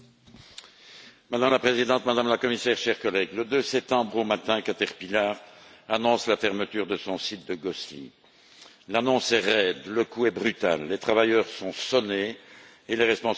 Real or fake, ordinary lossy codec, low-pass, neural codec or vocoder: real; none; none; none